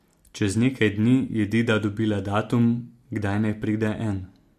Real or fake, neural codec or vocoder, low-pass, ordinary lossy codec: real; none; 14.4 kHz; MP3, 64 kbps